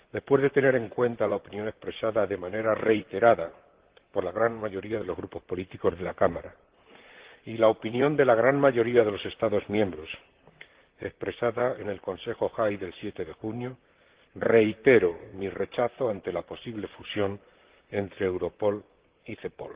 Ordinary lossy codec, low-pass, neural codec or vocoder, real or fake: Opus, 16 kbps; 3.6 kHz; vocoder, 44.1 kHz, 128 mel bands, Pupu-Vocoder; fake